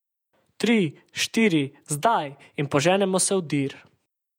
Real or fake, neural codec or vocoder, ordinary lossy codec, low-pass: fake; vocoder, 48 kHz, 128 mel bands, Vocos; none; 19.8 kHz